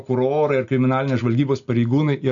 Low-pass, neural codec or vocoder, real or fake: 7.2 kHz; none; real